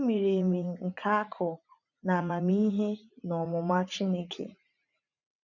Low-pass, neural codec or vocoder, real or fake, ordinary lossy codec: 7.2 kHz; vocoder, 44.1 kHz, 80 mel bands, Vocos; fake; none